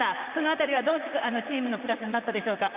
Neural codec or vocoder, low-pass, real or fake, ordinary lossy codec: vocoder, 44.1 kHz, 128 mel bands, Pupu-Vocoder; 3.6 kHz; fake; Opus, 64 kbps